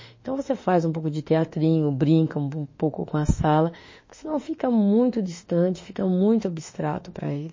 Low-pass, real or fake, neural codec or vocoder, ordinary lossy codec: 7.2 kHz; fake; autoencoder, 48 kHz, 32 numbers a frame, DAC-VAE, trained on Japanese speech; MP3, 32 kbps